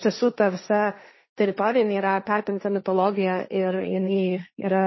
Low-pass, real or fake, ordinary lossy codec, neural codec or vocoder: 7.2 kHz; fake; MP3, 24 kbps; codec, 16 kHz, 1.1 kbps, Voila-Tokenizer